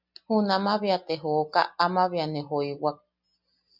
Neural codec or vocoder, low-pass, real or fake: none; 5.4 kHz; real